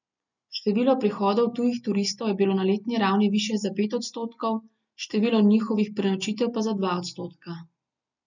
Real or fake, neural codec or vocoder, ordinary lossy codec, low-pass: real; none; none; 7.2 kHz